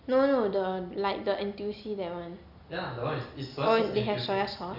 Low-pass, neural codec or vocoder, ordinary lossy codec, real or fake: 5.4 kHz; none; none; real